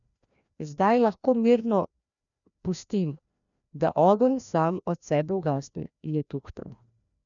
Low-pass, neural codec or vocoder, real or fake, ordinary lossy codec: 7.2 kHz; codec, 16 kHz, 1 kbps, FreqCodec, larger model; fake; none